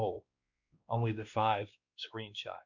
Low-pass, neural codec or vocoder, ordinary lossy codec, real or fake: 7.2 kHz; codec, 16 kHz, 2 kbps, X-Codec, WavLM features, trained on Multilingual LibriSpeech; MP3, 64 kbps; fake